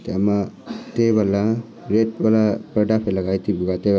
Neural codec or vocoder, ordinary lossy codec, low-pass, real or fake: none; none; none; real